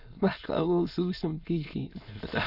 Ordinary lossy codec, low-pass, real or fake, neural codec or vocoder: none; 5.4 kHz; fake; autoencoder, 22.05 kHz, a latent of 192 numbers a frame, VITS, trained on many speakers